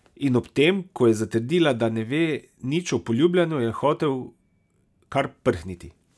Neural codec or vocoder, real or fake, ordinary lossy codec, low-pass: none; real; none; none